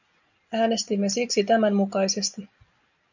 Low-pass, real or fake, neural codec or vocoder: 7.2 kHz; real; none